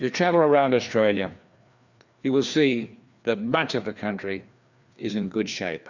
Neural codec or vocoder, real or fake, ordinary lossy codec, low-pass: codec, 16 kHz, 2 kbps, FreqCodec, larger model; fake; Opus, 64 kbps; 7.2 kHz